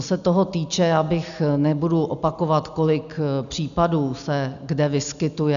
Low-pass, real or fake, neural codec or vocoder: 7.2 kHz; real; none